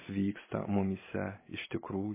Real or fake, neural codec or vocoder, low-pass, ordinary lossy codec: real; none; 3.6 kHz; MP3, 16 kbps